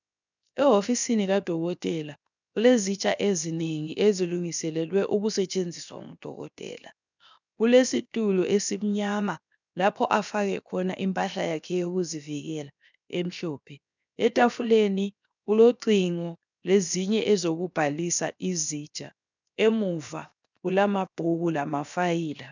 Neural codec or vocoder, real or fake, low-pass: codec, 16 kHz, 0.7 kbps, FocalCodec; fake; 7.2 kHz